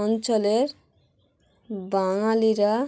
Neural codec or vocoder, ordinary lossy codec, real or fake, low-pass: none; none; real; none